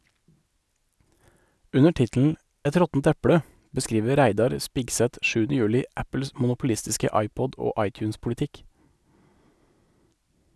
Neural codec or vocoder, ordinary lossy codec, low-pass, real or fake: none; none; none; real